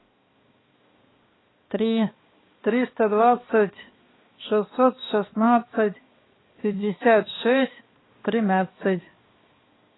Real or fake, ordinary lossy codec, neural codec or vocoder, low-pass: fake; AAC, 16 kbps; codec, 16 kHz, 2 kbps, X-Codec, HuBERT features, trained on balanced general audio; 7.2 kHz